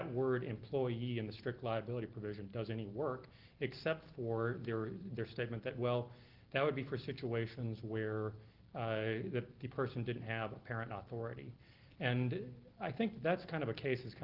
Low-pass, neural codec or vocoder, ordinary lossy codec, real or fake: 5.4 kHz; none; Opus, 16 kbps; real